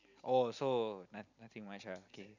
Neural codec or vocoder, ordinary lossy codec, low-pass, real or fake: none; none; 7.2 kHz; real